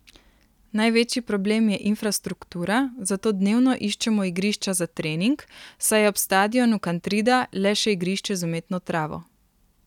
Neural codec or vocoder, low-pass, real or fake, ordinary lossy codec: none; 19.8 kHz; real; none